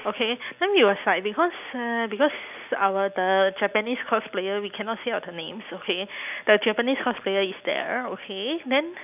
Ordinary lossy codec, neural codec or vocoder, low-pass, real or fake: none; none; 3.6 kHz; real